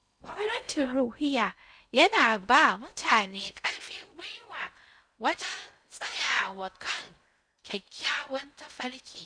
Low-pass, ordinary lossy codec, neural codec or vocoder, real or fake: 9.9 kHz; none; codec, 16 kHz in and 24 kHz out, 0.6 kbps, FocalCodec, streaming, 2048 codes; fake